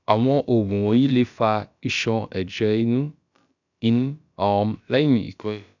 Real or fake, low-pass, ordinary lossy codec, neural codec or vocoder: fake; 7.2 kHz; none; codec, 16 kHz, about 1 kbps, DyCAST, with the encoder's durations